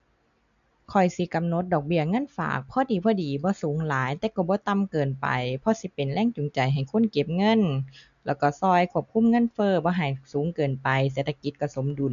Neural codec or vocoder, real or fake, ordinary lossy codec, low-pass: none; real; none; 7.2 kHz